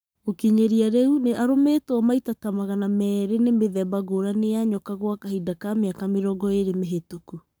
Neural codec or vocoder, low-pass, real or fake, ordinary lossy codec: codec, 44.1 kHz, 7.8 kbps, Pupu-Codec; none; fake; none